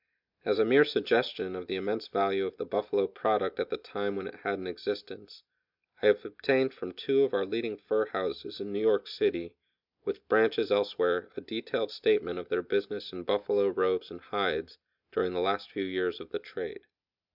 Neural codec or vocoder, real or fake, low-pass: none; real; 5.4 kHz